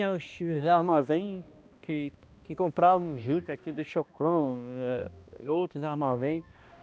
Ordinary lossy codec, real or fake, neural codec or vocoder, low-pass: none; fake; codec, 16 kHz, 1 kbps, X-Codec, HuBERT features, trained on balanced general audio; none